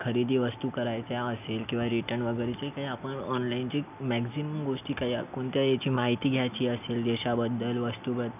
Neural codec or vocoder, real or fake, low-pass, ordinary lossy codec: none; real; 3.6 kHz; none